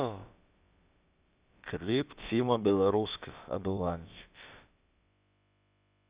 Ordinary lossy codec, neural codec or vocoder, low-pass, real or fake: Opus, 64 kbps; codec, 16 kHz, about 1 kbps, DyCAST, with the encoder's durations; 3.6 kHz; fake